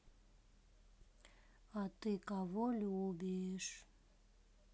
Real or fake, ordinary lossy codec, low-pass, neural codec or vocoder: real; none; none; none